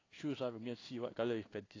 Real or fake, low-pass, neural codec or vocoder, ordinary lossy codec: fake; 7.2 kHz; codec, 16 kHz in and 24 kHz out, 1 kbps, XY-Tokenizer; AAC, 32 kbps